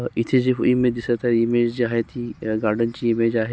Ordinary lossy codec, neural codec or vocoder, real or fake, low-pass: none; none; real; none